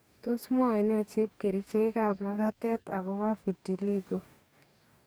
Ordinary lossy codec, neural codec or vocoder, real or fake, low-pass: none; codec, 44.1 kHz, 2.6 kbps, DAC; fake; none